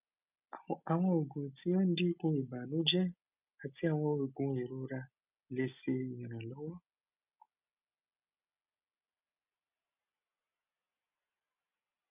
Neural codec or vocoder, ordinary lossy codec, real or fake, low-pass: none; none; real; 3.6 kHz